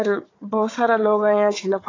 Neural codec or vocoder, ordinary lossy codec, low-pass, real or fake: codec, 16 kHz, 4 kbps, FunCodec, trained on Chinese and English, 50 frames a second; AAC, 32 kbps; 7.2 kHz; fake